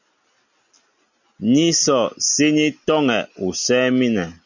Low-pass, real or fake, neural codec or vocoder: 7.2 kHz; real; none